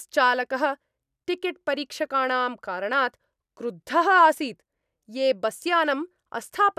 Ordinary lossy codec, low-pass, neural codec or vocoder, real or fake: none; 14.4 kHz; none; real